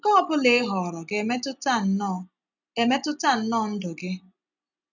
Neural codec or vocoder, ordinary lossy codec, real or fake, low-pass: none; none; real; 7.2 kHz